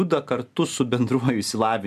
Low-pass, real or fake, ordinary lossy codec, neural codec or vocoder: 14.4 kHz; real; MP3, 96 kbps; none